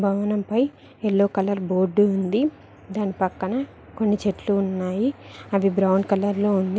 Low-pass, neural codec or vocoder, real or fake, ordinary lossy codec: none; none; real; none